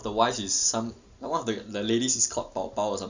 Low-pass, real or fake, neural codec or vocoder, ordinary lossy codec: 7.2 kHz; real; none; Opus, 64 kbps